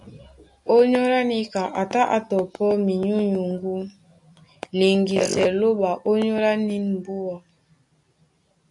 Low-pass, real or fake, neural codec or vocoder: 10.8 kHz; real; none